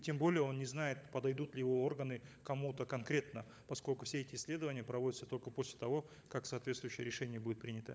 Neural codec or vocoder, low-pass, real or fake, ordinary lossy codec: codec, 16 kHz, 16 kbps, FunCodec, trained on LibriTTS, 50 frames a second; none; fake; none